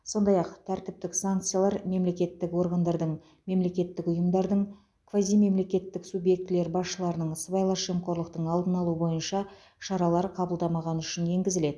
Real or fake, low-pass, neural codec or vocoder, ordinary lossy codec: real; none; none; none